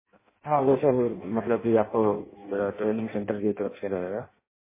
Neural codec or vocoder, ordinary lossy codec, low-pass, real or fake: codec, 16 kHz in and 24 kHz out, 0.6 kbps, FireRedTTS-2 codec; MP3, 16 kbps; 3.6 kHz; fake